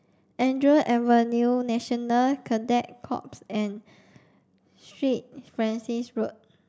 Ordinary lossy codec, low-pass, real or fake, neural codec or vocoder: none; none; real; none